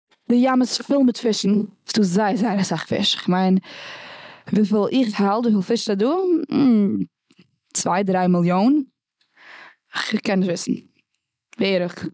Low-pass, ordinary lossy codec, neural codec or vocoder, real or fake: none; none; none; real